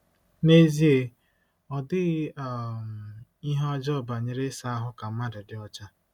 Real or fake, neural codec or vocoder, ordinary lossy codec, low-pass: real; none; none; 19.8 kHz